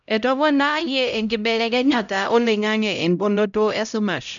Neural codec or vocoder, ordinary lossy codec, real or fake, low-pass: codec, 16 kHz, 0.5 kbps, X-Codec, HuBERT features, trained on LibriSpeech; none; fake; 7.2 kHz